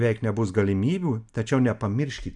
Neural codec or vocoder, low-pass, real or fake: none; 10.8 kHz; real